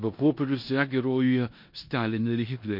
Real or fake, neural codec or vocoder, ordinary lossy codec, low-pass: fake; codec, 16 kHz in and 24 kHz out, 0.9 kbps, LongCat-Audio-Codec, four codebook decoder; MP3, 32 kbps; 5.4 kHz